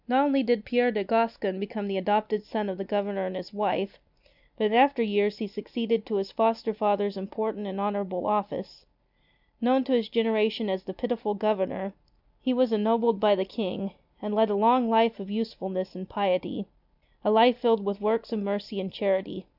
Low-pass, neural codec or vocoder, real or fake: 5.4 kHz; none; real